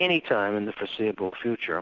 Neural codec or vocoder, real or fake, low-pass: none; real; 7.2 kHz